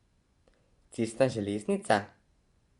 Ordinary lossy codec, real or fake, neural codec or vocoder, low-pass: Opus, 64 kbps; fake; vocoder, 24 kHz, 100 mel bands, Vocos; 10.8 kHz